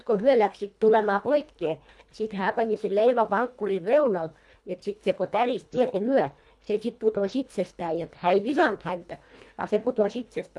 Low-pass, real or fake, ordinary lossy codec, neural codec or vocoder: none; fake; none; codec, 24 kHz, 1.5 kbps, HILCodec